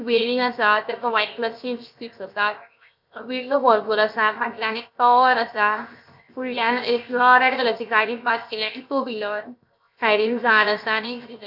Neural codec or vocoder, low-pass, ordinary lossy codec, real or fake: codec, 16 kHz, 0.7 kbps, FocalCodec; 5.4 kHz; none; fake